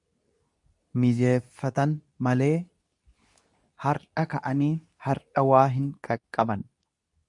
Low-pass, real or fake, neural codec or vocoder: 10.8 kHz; fake; codec, 24 kHz, 0.9 kbps, WavTokenizer, medium speech release version 2